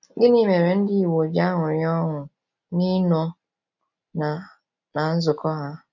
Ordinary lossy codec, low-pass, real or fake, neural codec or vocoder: none; 7.2 kHz; real; none